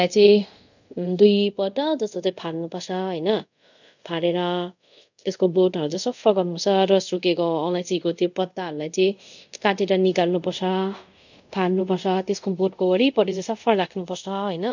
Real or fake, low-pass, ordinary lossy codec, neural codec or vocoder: fake; 7.2 kHz; none; codec, 24 kHz, 0.5 kbps, DualCodec